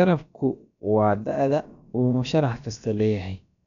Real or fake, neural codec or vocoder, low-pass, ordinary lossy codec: fake; codec, 16 kHz, about 1 kbps, DyCAST, with the encoder's durations; 7.2 kHz; none